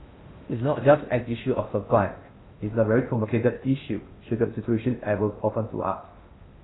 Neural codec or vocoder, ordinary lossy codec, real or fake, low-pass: codec, 16 kHz in and 24 kHz out, 0.6 kbps, FocalCodec, streaming, 4096 codes; AAC, 16 kbps; fake; 7.2 kHz